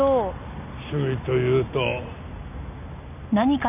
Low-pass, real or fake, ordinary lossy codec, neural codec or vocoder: 3.6 kHz; real; none; none